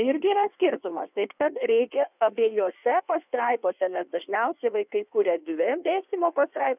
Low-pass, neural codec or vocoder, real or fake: 3.6 kHz; codec, 16 kHz in and 24 kHz out, 1.1 kbps, FireRedTTS-2 codec; fake